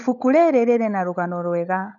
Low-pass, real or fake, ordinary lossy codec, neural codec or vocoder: 7.2 kHz; fake; none; codec, 16 kHz, 16 kbps, FunCodec, trained on LibriTTS, 50 frames a second